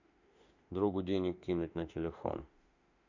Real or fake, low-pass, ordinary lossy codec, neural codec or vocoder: fake; 7.2 kHz; AAC, 48 kbps; autoencoder, 48 kHz, 32 numbers a frame, DAC-VAE, trained on Japanese speech